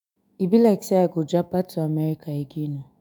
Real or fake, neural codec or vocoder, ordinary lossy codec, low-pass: fake; autoencoder, 48 kHz, 128 numbers a frame, DAC-VAE, trained on Japanese speech; none; none